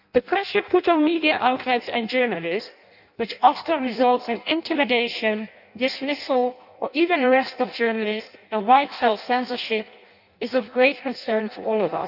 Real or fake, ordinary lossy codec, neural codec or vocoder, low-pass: fake; none; codec, 16 kHz in and 24 kHz out, 0.6 kbps, FireRedTTS-2 codec; 5.4 kHz